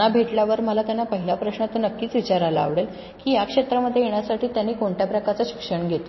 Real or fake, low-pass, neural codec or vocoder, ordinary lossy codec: real; 7.2 kHz; none; MP3, 24 kbps